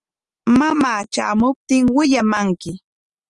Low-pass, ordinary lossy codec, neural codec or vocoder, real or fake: 10.8 kHz; Opus, 32 kbps; none; real